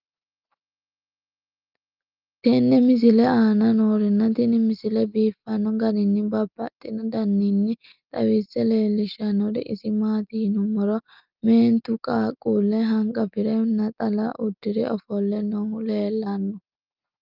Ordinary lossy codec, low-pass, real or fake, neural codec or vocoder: Opus, 24 kbps; 5.4 kHz; real; none